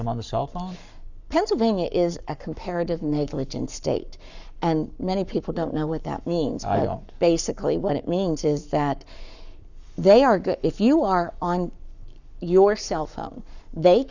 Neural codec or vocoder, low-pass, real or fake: codec, 44.1 kHz, 7.8 kbps, Pupu-Codec; 7.2 kHz; fake